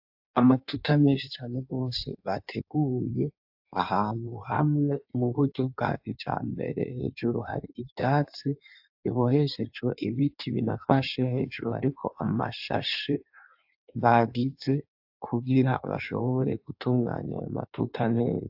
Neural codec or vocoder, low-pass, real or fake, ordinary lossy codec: codec, 16 kHz in and 24 kHz out, 1.1 kbps, FireRedTTS-2 codec; 5.4 kHz; fake; AAC, 48 kbps